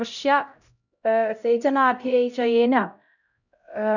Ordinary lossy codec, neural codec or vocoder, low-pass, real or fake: none; codec, 16 kHz, 0.5 kbps, X-Codec, HuBERT features, trained on LibriSpeech; 7.2 kHz; fake